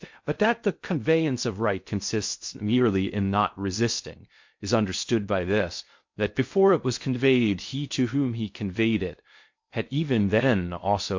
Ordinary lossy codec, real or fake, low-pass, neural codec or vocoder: MP3, 48 kbps; fake; 7.2 kHz; codec, 16 kHz in and 24 kHz out, 0.6 kbps, FocalCodec, streaming, 2048 codes